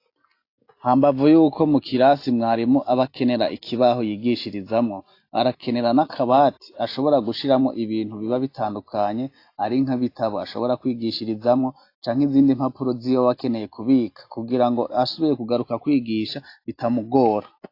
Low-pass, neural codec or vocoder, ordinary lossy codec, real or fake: 5.4 kHz; none; AAC, 32 kbps; real